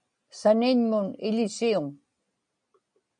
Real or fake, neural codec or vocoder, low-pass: real; none; 9.9 kHz